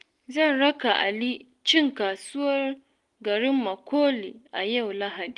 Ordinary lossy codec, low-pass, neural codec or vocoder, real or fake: Opus, 24 kbps; 10.8 kHz; none; real